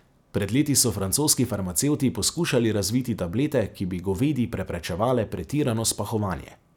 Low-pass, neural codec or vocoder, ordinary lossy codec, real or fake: 19.8 kHz; none; none; real